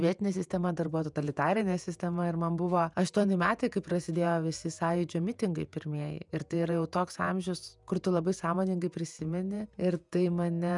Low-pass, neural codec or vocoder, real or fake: 10.8 kHz; vocoder, 48 kHz, 128 mel bands, Vocos; fake